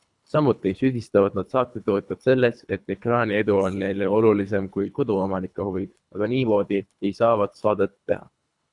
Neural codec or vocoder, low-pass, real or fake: codec, 24 kHz, 3 kbps, HILCodec; 10.8 kHz; fake